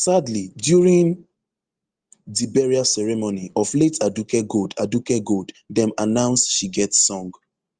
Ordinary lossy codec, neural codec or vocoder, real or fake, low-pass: Opus, 24 kbps; none; real; 9.9 kHz